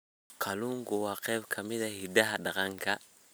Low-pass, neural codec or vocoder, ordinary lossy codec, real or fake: none; none; none; real